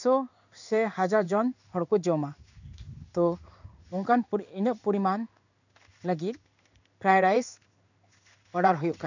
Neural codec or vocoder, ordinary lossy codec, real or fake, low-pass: codec, 16 kHz in and 24 kHz out, 1 kbps, XY-Tokenizer; none; fake; 7.2 kHz